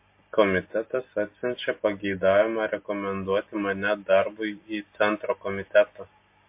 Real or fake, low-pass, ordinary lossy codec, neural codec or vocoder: real; 3.6 kHz; MP3, 24 kbps; none